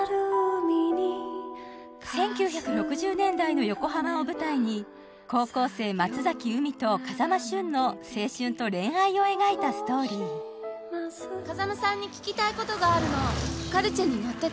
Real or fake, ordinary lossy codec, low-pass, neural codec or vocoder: real; none; none; none